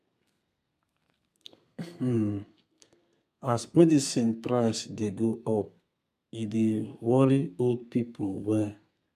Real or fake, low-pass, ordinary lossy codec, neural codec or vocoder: fake; 14.4 kHz; none; codec, 32 kHz, 1.9 kbps, SNAC